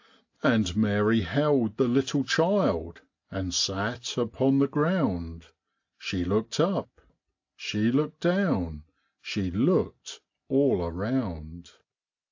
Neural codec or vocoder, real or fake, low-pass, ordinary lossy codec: none; real; 7.2 kHz; AAC, 48 kbps